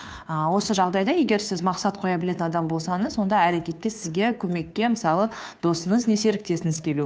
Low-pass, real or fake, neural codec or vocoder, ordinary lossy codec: none; fake; codec, 16 kHz, 2 kbps, FunCodec, trained on Chinese and English, 25 frames a second; none